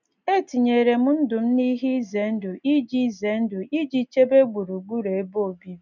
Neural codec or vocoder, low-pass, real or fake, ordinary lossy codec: none; 7.2 kHz; real; none